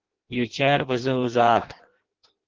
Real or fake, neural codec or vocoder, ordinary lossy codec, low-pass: fake; codec, 16 kHz in and 24 kHz out, 0.6 kbps, FireRedTTS-2 codec; Opus, 16 kbps; 7.2 kHz